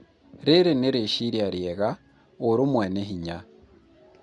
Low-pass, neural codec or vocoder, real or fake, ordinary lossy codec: 10.8 kHz; none; real; Opus, 64 kbps